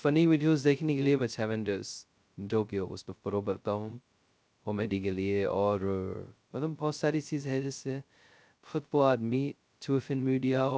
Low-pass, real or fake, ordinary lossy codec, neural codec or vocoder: none; fake; none; codec, 16 kHz, 0.2 kbps, FocalCodec